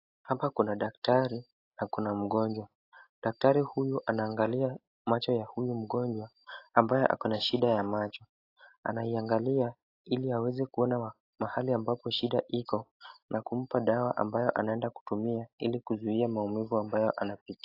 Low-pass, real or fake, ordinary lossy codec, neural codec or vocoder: 5.4 kHz; real; AAC, 32 kbps; none